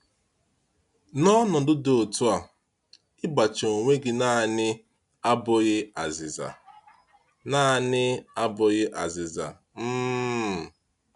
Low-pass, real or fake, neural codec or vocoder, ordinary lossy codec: 10.8 kHz; real; none; none